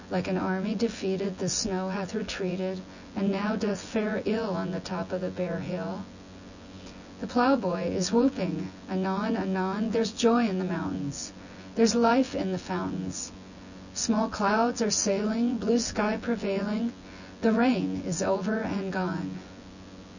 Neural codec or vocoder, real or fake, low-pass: vocoder, 24 kHz, 100 mel bands, Vocos; fake; 7.2 kHz